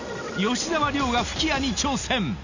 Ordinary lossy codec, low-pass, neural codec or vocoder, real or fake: none; 7.2 kHz; none; real